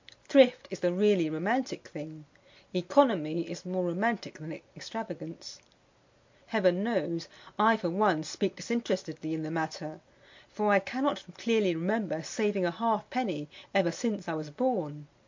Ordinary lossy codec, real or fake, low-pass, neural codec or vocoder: MP3, 48 kbps; real; 7.2 kHz; none